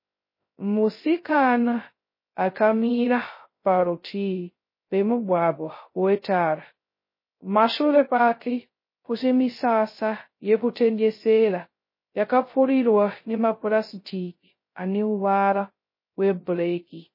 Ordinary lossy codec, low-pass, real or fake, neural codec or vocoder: MP3, 24 kbps; 5.4 kHz; fake; codec, 16 kHz, 0.2 kbps, FocalCodec